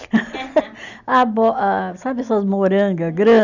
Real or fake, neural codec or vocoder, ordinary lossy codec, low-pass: real; none; none; 7.2 kHz